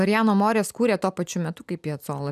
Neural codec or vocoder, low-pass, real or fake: none; 14.4 kHz; real